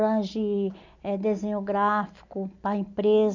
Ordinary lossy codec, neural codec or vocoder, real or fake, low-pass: none; none; real; 7.2 kHz